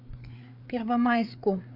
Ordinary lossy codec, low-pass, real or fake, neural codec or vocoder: AAC, 48 kbps; 5.4 kHz; fake; codec, 16 kHz, 4 kbps, FreqCodec, larger model